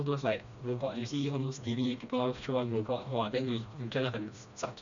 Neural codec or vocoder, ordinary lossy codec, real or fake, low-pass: codec, 16 kHz, 1 kbps, FreqCodec, smaller model; none; fake; 7.2 kHz